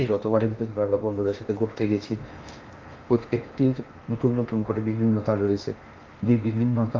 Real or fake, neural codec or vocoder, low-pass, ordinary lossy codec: fake; codec, 16 kHz in and 24 kHz out, 0.8 kbps, FocalCodec, streaming, 65536 codes; 7.2 kHz; Opus, 24 kbps